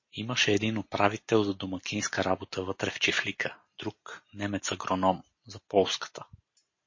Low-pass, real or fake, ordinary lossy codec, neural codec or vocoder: 7.2 kHz; real; MP3, 32 kbps; none